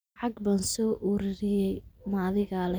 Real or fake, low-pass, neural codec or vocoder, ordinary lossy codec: real; none; none; none